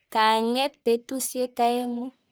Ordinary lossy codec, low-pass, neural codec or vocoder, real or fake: none; none; codec, 44.1 kHz, 1.7 kbps, Pupu-Codec; fake